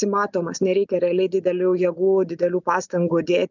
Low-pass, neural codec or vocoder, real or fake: 7.2 kHz; none; real